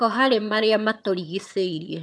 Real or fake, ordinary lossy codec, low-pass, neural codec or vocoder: fake; none; none; vocoder, 22.05 kHz, 80 mel bands, HiFi-GAN